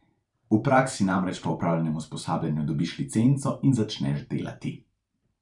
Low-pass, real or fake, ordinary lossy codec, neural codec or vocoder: 10.8 kHz; fake; none; vocoder, 44.1 kHz, 128 mel bands every 512 samples, BigVGAN v2